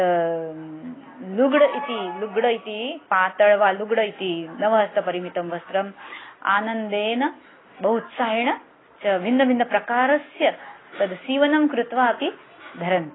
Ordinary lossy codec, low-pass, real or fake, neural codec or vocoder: AAC, 16 kbps; 7.2 kHz; real; none